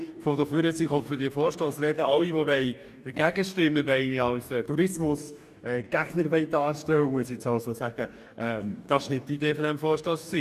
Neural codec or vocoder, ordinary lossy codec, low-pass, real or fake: codec, 44.1 kHz, 2.6 kbps, DAC; none; 14.4 kHz; fake